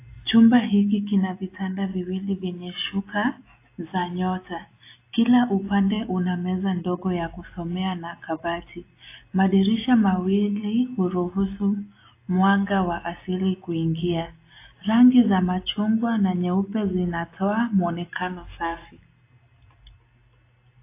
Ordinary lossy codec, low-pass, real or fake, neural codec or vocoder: AAC, 24 kbps; 3.6 kHz; real; none